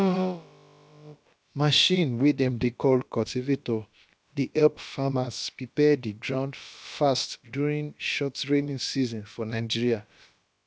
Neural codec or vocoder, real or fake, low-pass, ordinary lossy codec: codec, 16 kHz, about 1 kbps, DyCAST, with the encoder's durations; fake; none; none